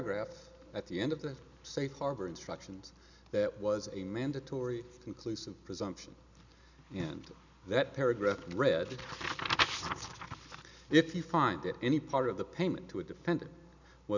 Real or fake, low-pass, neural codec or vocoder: real; 7.2 kHz; none